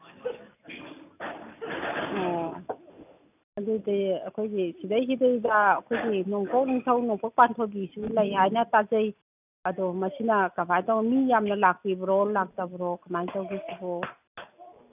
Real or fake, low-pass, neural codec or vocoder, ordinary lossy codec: real; 3.6 kHz; none; none